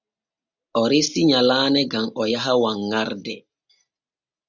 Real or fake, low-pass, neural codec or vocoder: real; 7.2 kHz; none